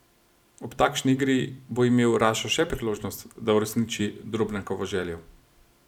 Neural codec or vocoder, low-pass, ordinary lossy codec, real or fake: vocoder, 44.1 kHz, 128 mel bands every 256 samples, BigVGAN v2; 19.8 kHz; none; fake